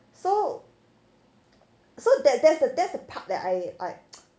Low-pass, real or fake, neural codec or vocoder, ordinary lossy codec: none; real; none; none